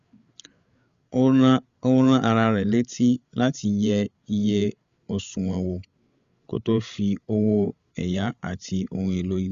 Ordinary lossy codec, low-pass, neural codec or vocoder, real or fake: Opus, 64 kbps; 7.2 kHz; codec, 16 kHz, 8 kbps, FreqCodec, larger model; fake